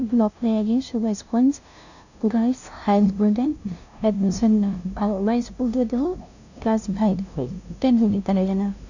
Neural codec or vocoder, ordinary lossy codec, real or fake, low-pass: codec, 16 kHz, 0.5 kbps, FunCodec, trained on LibriTTS, 25 frames a second; none; fake; 7.2 kHz